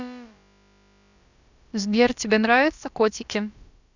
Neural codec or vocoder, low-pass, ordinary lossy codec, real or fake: codec, 16 kHz, about 1 kbps, DyCAST, with the encoder's durations; 7.2 kHz; none; fake